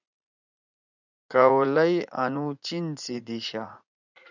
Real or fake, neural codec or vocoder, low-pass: fake; vocoder, 44.1 kHz, 80 mel bands, Vocos; 7.2 kHz